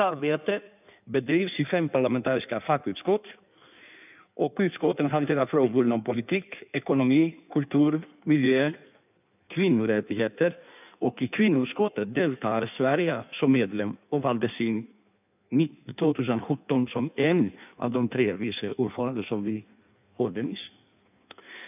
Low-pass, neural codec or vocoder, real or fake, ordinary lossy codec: 3.6 kHz; codec, 16 kHz in and 24 kHz out, 1.1 kbps, FireRedTTS-2 codec; fake; none